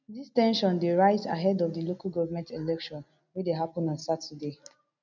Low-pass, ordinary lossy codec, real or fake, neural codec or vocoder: 7.2 kHz; none; real; none